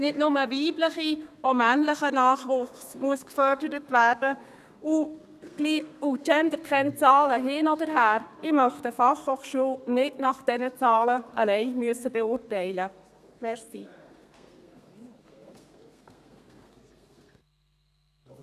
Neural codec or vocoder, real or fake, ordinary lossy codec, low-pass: codec, 32 kHz, 1.9 kbps, SNAC; fake; none; 14.4 kHz